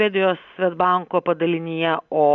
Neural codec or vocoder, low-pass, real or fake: none; 7.2 kHz; real